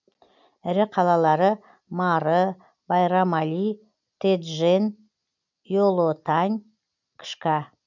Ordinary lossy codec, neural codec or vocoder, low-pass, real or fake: none; none; 7.2 kHz; real